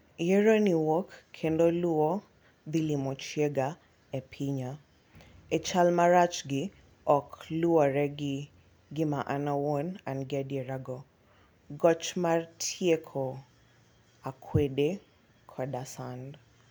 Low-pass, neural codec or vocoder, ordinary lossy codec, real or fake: none; none; none; real